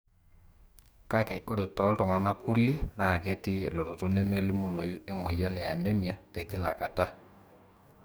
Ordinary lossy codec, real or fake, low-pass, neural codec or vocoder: none; fake; none; codec, 44.1 kHz, 2.6 kbps, DAC